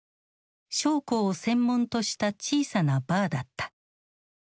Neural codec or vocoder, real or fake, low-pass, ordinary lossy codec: none; real; none; none